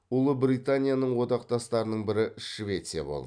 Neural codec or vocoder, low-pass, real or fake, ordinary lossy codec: none; 9.9 kHz; real; none